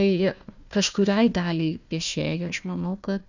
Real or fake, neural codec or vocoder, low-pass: fake; codec, 16 kHz, 1 kbps, FunCodec, trained on Chinese and English, 50 frames a second; 7.2 kHz